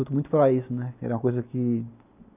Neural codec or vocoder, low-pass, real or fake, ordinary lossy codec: none; 3.6 kHz; real; none